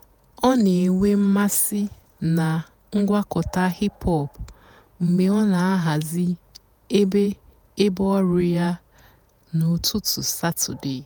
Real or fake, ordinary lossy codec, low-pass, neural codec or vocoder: fake; none; none; vocoder, 48 kHz, 128 mel bands, Vocos